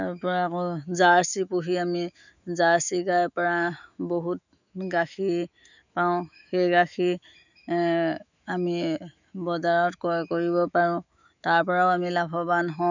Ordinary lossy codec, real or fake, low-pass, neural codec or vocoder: none; fake; 7.2 kHz; autoencoder, 48 kHz, 128 numbers a frame, DAC-VAE, trained on Japanese speech